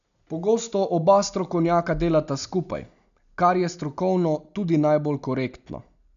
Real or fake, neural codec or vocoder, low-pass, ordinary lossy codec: real; none; 7.2 kHz; none